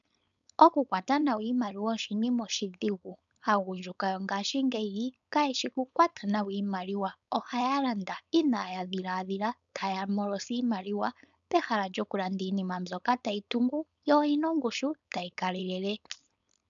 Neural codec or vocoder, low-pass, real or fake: codec, 16 kHz, 4.8 kbps, FACodec; 7.2 kHz; fake